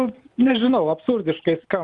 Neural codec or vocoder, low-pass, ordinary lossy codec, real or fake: none; 10.8 kHz; Opus, 32 kbps; real